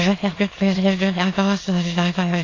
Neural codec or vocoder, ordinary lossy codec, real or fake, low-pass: autoencoder, 22.05 kHz, a latent of 192 numbers a frame, VITS, trained on many speakers; AAC, 32 kbps; fake; 7.2 kHz